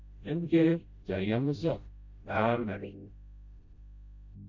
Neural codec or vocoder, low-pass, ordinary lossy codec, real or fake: codec, 16 kHz, 0.5 kbps, FreqCodec, smaller model; 7.2 kHz; MP3, 48 kbps; fake